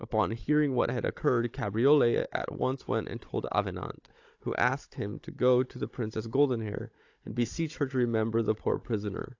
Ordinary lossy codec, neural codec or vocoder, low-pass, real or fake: AAC, 48 kbps; codec, 16 kHz, 16 kbps, FunCodec, trained on Chinese and English, 50 frames a second; 7.2 kHz; fake